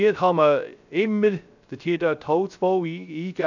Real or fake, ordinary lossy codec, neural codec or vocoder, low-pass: fake; none; codec, 16 kHz, 0.3 kbps, FocalCodec; 7.2 kHz